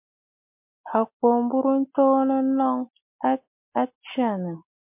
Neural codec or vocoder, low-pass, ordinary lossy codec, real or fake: none; 3.6 kHz; MP3, 32 kbps; real